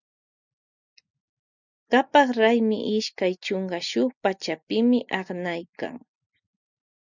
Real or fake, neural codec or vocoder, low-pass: real; none; 7.2 kHz